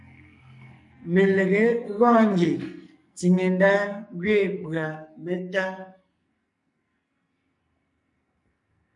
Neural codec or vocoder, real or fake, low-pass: codec, 44.1 kHz, 2.6 kbps, SNAC; fake; 10.8 kHz